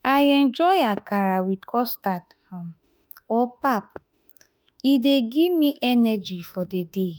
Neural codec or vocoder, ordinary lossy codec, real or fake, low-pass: autoencoder, 48 kHz, 32 numbers a frame, DAC-VAE, trained on Japanese speech; none; fake; none